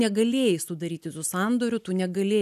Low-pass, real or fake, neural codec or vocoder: 14.4 kHz; real; none